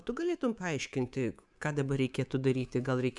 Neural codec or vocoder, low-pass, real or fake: codec, 24 kHz, 3.1 kbps, DualCodec; 10.8 kHz; fake